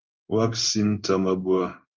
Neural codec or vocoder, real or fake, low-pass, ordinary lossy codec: codec, 16 kHz in and 24 kHz out, 1 kbps, XY-Tokenizer; fake; 7.2 kHz; Opus, 32 kbps